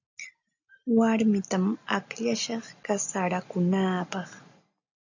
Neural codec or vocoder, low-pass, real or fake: none; 7.2 kHz; real